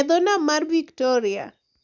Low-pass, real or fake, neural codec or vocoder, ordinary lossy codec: 7.2 kHz; real; none; none